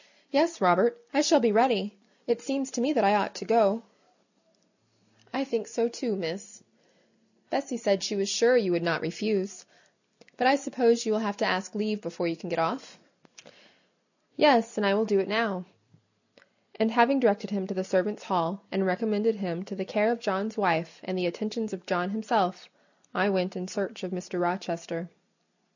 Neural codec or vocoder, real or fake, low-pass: none; real; 7.2 kHz